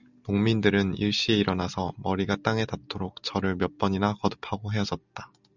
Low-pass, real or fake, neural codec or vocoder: 7.2 kHz; real; none